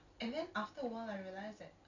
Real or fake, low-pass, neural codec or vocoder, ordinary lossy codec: real; 7.2 kHz; none; none